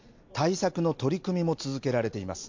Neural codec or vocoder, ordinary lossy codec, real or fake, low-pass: none; MP3, 48 kbps; real; 7.2 kHz